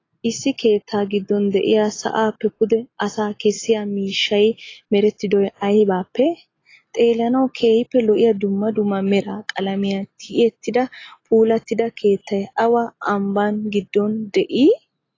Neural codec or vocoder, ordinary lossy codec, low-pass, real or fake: none; AAC, 32 kbps; 7.2 kHz; real